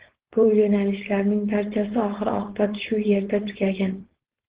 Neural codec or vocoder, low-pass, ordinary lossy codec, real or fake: codec, 16 kHz, 4.8 kbps, FACodec; 3.6 kHz; Opus, 16 kbps; fake